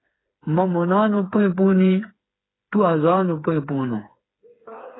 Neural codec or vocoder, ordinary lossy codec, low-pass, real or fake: codec, 16 kHz, 4 kbps, FreqCodec, smaller model; AAC, 16 kbps; 7.2 kHz; fake